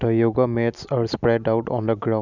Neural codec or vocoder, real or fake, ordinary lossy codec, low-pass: none; real; none; 7.2 kHz